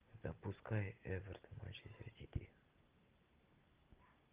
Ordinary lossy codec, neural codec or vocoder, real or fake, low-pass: Opus, 32 kbps; none; real; 3.6 kHz